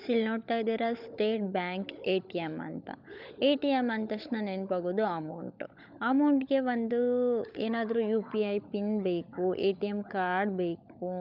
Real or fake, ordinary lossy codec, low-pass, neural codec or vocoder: fake; none; 5.4 kHz; codec, 16 kHz, 16 kbps, FunCodec, trained on LibriTTS, 50 frames a second